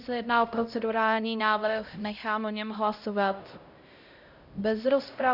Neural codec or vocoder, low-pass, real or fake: codec, 16 kHz, 0.5 kbps, X-Codec, HuBERT features, trained on LibriSpeech; 5.4 kHz; fake